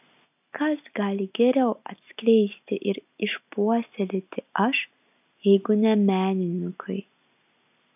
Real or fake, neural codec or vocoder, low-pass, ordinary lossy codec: real; none; 3.6 kHz; AAC, 32 kbps